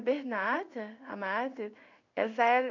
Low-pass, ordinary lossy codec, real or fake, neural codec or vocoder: 7.2 kHz; none; fake; codec, 16 kHz in and 24 kHz out, 1 kbps, XY-Tokenizer